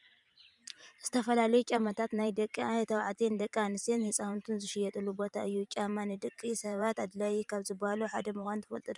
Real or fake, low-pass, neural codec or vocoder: fake; 10.8 kHz; vocoder, 44.1 kHz, 128 mel bands every 512 samples, BigVGAN v2